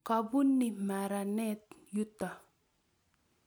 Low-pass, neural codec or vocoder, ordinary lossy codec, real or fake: none; none; none; real